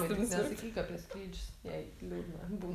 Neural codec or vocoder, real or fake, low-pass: none; real; 14.4 kHz